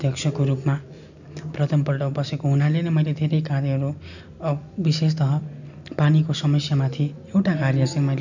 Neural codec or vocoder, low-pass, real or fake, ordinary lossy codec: none; 7.2 kHz; real; none